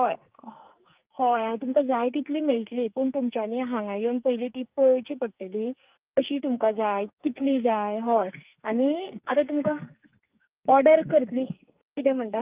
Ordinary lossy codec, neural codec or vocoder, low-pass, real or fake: Opus, 24 kbps; codec, 44.1 kHz, 2.6 kbps, SNAC; 3.6 kHz; fake